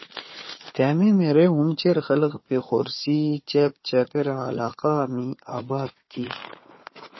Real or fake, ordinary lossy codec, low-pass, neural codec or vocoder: fake; MP3, 24 kbps; 7.2 kHz; codec, 16 kHz, 4 kbps, FunCodec, trained on Chinese and English, 50 frames a second